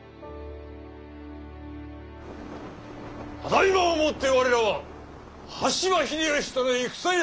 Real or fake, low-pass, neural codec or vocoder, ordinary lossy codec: real; none; none; none